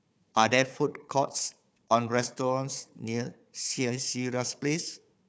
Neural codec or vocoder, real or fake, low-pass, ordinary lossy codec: codec, 16 kHz, 4 kbps, FunCodec, trained on Chinese and English, 50 frames a second; fake; none; none